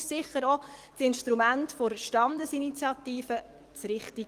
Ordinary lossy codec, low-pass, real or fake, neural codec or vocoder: Opus, 24 kbps; 14.4 kHz; fake; codec, 44.1 kHz, 7.8 kbps, DAC